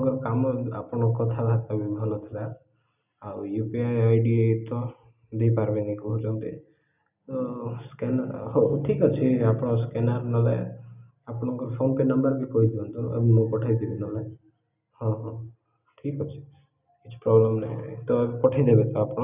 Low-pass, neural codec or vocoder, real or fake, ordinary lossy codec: 3.6 kHz; none; real; none